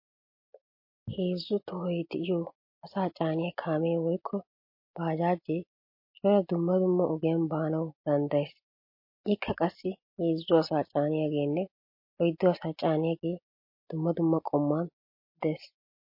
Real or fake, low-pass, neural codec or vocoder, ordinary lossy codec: real; 5.4 kHz; none; MP3, 32 kbps